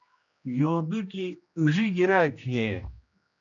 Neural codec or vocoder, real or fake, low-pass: codec, 16 kHz, 1 kbps, X-Codec, HuBERT features, trained on general audio; fake; 7.2 kHz